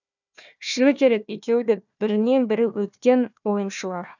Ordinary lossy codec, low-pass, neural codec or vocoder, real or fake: none; 7.2 kHz; codec, 16 kHz, 1 kbps, FunCodec, trained on Chinese and English, 50 frames a second; fake